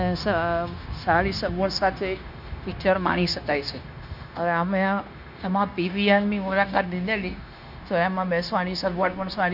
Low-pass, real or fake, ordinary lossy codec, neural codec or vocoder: 5.4 kHz; fake; none; codec, 16 kHz, 0.9 kbps, LongCat-Audio-Codec